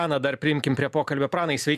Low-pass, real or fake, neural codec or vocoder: 14.4 kHz; real; none